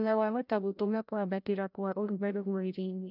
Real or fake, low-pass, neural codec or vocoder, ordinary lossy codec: fake; 5.4 kHz; codec, 16 kHz, 0.5 kbps, FreqCodec, larger model; none